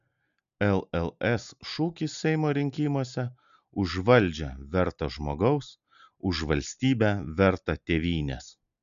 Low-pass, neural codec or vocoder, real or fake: 7.2 kHz; none; real